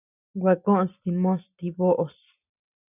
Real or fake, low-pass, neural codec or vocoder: real; 3.6 kHz; none